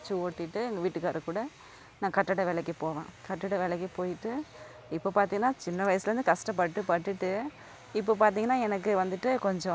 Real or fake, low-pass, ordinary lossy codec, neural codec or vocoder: real; none; none; none